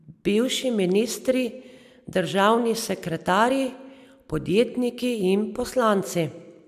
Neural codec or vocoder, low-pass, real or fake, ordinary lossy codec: none; 14.4 kHz; real; none